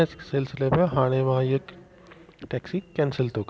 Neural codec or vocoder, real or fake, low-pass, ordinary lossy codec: none; real; none; none